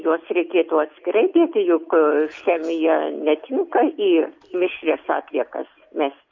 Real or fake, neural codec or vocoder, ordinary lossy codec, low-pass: real; none; MP3, 48 kbps; 7.2 kHz